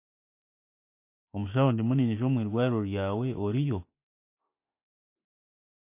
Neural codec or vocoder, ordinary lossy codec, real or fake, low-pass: none; MP3, 24 kbps; real; 3.6 kHz